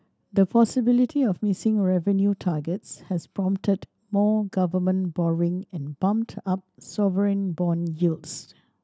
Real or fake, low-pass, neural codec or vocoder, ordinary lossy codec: real; none; none; none